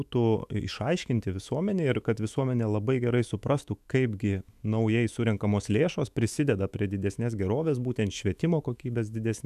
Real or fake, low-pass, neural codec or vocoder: fake; 14.4 kHz; autoencoder, 48 kHz, 128 numbers a frame, DAC-VAE, trained on Japanese speech